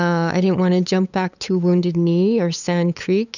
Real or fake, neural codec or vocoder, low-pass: fake; codec, 16 kHz, 8 kbps, FunCodec, trained on LibriTTS, 25 frames a second; 7.2 kHz